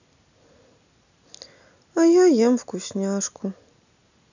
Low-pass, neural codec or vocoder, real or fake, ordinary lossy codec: 7.2 kHz; none; real; none